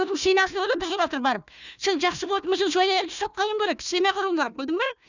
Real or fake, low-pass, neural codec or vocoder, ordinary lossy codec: fake; 7.2 kHz; codec, 16 kHz, 1 kbps, FunCodec, trained on Chinese and English, 50 frames a second; none